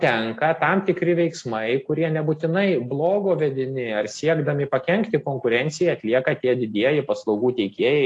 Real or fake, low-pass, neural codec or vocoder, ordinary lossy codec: real; 10.8 kHz; none; AAC, 48 kbps